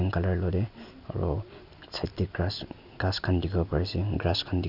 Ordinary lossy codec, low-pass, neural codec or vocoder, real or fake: none; 5.4 kHz; none; real